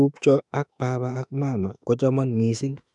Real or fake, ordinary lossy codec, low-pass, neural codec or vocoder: fake; none; 10.8 kHz; autoencoder, 48 kHz, 32 numbers a frame, DAC-VAE, trained on Japanese speech